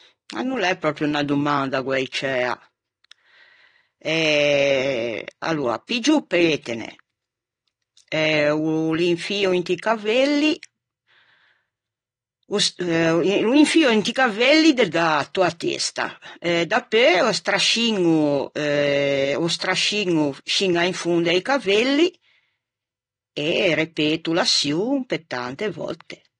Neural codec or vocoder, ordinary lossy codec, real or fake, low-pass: none; AAC, 32 kbps; real; 9.9 kHz